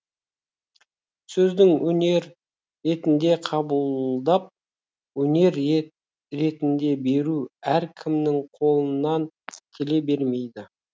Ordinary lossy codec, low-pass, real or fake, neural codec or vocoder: none; none; real; none